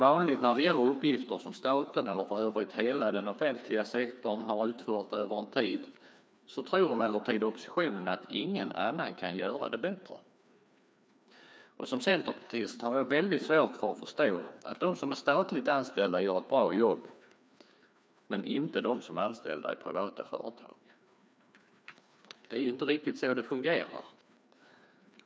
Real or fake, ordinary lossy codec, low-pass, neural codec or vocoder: fake; none; none; codec, 16 kHz, 2 kbps, FreqCodec, larger model